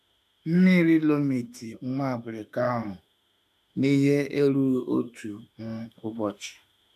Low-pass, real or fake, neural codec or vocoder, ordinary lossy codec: 14.4 kHz; fake; autoencoder, 48 kHz, 32 numbers a frame, DAC-VAE, trained on Japanese speech; none